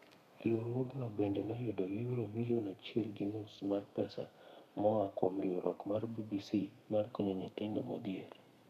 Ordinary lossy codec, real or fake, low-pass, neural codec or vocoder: none; fake; 14.4 kHz; codec, 32 kHz, 1.9 kbps, SNAC